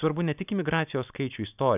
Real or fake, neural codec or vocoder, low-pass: real; none; 3.6 kHz